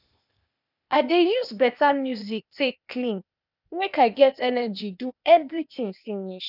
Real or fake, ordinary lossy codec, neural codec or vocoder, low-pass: fake; none; codec, 16 kHz, 0.8 kbps, ZipCodec; 5.4 kHz